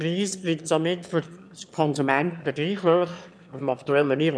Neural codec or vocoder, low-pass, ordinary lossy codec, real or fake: autoencoder, 22.05 kHz, a latent of 192 numbers a frame, VITS, trained on one speaker; none; none; fake